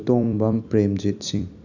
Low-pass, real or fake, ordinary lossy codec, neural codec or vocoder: 7.2 kHz; fake; none; vocoder, 44.1 kHz, 128 mel bands every 256 samples, BigVGAN v2